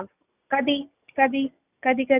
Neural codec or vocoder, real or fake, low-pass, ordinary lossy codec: none; real; 3.6 kHz; none